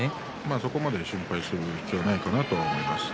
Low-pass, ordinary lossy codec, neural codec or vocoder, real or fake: none; none; none; real